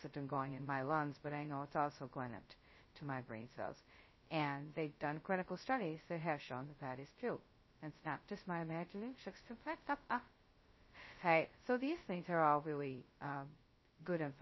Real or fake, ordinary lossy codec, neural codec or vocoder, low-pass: fake; MP3, 24 kbps; codec, 16 kHz, 0.2 kbps, FocalCodec; 7.2 kHz